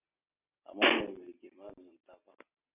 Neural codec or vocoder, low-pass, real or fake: none; 3.6 kHz; real